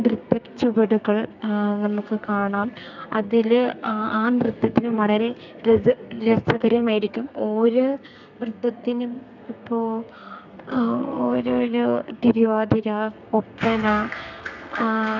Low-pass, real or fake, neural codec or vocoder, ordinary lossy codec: 7.2 kHz; fake; codec, 32 kHz, 1.9 kbps, SNAC; none